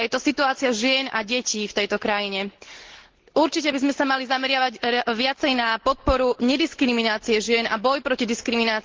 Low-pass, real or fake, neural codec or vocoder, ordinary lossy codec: 7.2 kHz; real; none; Opus, 16 kbps